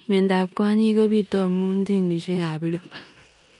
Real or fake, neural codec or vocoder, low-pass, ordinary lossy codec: fake; codec, 16 kHz in and 24 kHz out, 0.9 kbps, LongCat-Audio-Codec, four codebook decoder; 10.8 kHz; none